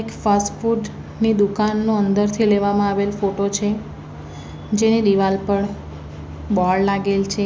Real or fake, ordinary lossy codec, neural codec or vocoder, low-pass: real; none; none; none